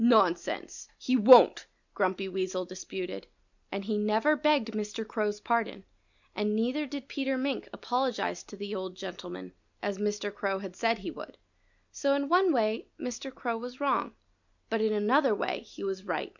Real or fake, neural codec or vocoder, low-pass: real; none; 7.2 kHz